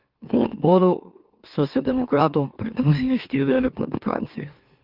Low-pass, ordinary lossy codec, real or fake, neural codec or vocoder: 5.4 kHz; Opus, 32 kbps; fake; autoencoder, 44.1 kHz, a latent of 192 numbers a frame, MeloTTS